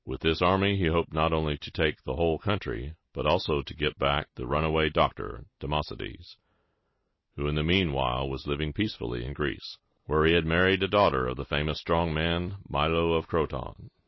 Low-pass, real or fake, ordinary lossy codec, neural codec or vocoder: 7.2 kHz; real; MP3, 24 kbps; none